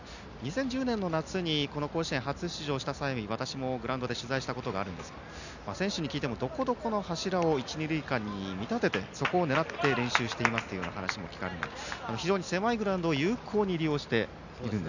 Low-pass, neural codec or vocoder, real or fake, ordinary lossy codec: 7.2 kHz; none; real; none